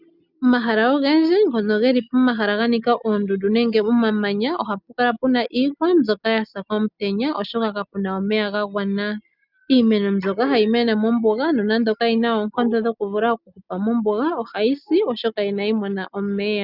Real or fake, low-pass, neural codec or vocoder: real; 5.4 kHz; none